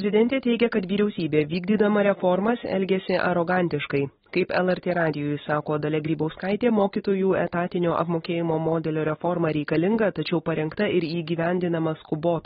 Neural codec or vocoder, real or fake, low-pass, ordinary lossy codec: none; real; 7.2 kHz; AAC, 16 kbps